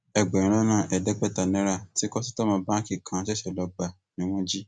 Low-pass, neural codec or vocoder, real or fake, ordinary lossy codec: none; none; real; none